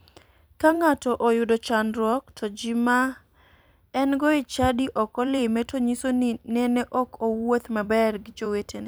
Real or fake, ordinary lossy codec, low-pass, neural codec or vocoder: fake; none; none; vocoder, 44.1 kHz, 128 mel bands every 256 samples, BigVGAN v2